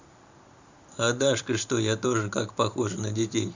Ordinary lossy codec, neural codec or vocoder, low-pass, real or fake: Opus, 64 kbps; vocoder, 44.1 kHz, 128 mel bands every 512 samples, BigVGAN v2; 7.2 kHz; fake